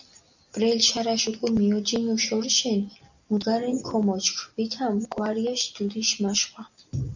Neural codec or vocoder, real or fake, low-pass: none; real; 7.2 kHz